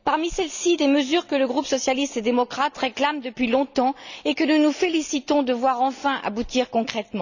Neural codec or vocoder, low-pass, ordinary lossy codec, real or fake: none; 7.2 kHz; none; real